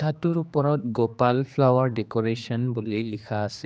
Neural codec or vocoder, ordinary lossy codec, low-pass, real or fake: codec, 16 kHz, 2 kbps, X-Codec, HuBERT features, trained on general audio; none; none; fake